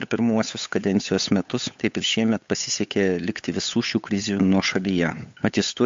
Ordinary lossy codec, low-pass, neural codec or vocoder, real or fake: MP3, 48 kbps; 7.2 kHz; codec, 16 kHz, 8 kbps, FunCodec, trained on Chinese and English, 25 frames a second; fake